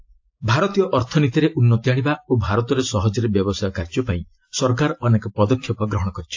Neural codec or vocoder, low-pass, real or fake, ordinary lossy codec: none; 7.2 kHz; real; AAC, 48 kbps